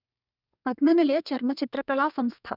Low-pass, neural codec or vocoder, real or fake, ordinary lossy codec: 5.4 kHz; codec, 32 kHz, 1.9 kbps, SNAC; fake; none